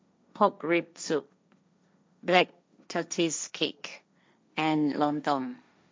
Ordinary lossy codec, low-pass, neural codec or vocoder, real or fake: none; none; codec, 16 kHz, 1.1 kbps, Voila-Tokenizer; fake